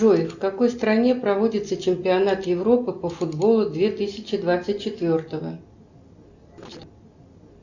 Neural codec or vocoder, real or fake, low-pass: none; real; 7.2 kHz